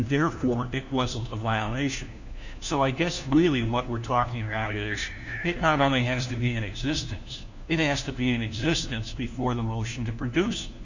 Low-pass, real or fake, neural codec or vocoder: 7.2 kHz; fake; codec, 16 kHz, 1 kbps, FunCodec, trained on LibriTTS, 50 frames a second